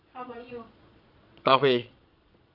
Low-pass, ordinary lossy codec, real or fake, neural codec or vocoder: 5.4 kHz; none; fake; vocoder, 22.05 kHz, 80 mel bands, Vocos